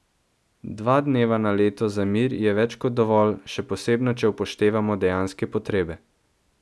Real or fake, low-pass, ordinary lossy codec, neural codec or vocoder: real; none; none; none